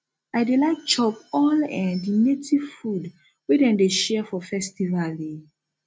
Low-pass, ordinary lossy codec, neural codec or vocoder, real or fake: none; none; none; real